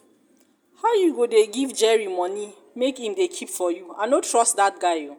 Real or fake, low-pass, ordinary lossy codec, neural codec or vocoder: real; none; none; none